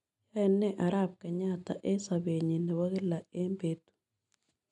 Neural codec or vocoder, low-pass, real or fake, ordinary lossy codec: none; 10.8 kHz; real; none